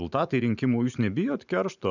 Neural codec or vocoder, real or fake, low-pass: vocoder, 44.1 kHz, 128 mel bands every 512 samples, BigVGAN v2; fake; 7.2 kHz